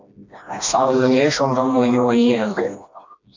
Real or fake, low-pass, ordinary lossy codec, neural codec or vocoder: fake; 7.2 kHz; AAC, 48 kbps; codec, 16 kHz, 1 kbps, FreqCodec, smaller model